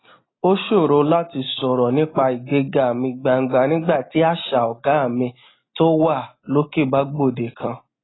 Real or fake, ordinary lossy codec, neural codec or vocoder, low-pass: real; AAC, 16 kbps; none; 7.2 kHz